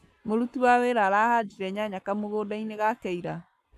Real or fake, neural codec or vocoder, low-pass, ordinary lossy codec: fake; codec, 44.1 kHz, 7.8 kbps, Pupu-Codec; 14.4 kHz; AAC, 96 kbps